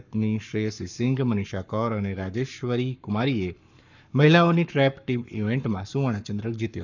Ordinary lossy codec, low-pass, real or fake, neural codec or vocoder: none; 7.2 kHz; fake; codec, 44.1 kHz, 7.8 kbps, Pupu-Codec